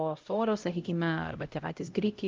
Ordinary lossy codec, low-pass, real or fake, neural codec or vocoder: Opus, 24 kbps; 7.2 kHz; fake; codec, 16 kHz, 0.5 kbps, X-Codec, HuBERT features, trained on LibriSpeech